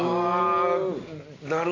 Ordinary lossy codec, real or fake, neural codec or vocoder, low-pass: AAC, 32 kbps; real; none; 7.2 kHz